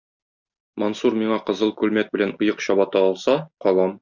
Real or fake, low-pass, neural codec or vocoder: real; 7.2 kHz; none